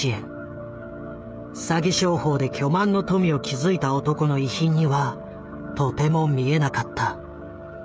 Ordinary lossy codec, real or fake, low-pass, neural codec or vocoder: none; fake; none; codec, 16 kHz, 16 kbps, FreqCodec, smaller model